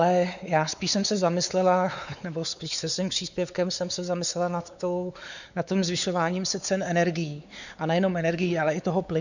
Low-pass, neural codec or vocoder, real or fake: 7.2 kHz; codec, 16 kHz, 4 kbps, X-Codec, WavLM features, trained on Multilingual LibriSpeech; fake